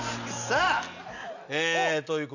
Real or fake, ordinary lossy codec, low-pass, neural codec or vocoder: real; none; 7.2 kHz; none